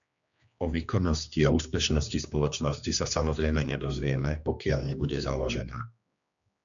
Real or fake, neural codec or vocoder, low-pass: fake; codec, 16 kHz, 2 kbps, X-Codec, HuBERT features, trained on general audio; 7.2 kHz